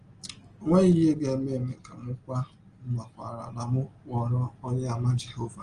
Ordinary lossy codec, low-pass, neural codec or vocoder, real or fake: Opus, 24 kbps; 9.9 kHz; none; real